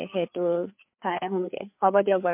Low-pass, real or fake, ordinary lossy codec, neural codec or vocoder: 3.6 kHz; fake; MP3, 32 kbps; codec, 16 kHz, 4 kbps, FreqCodec, larger model